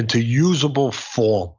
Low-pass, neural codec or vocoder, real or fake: 7.2 kHz; none; real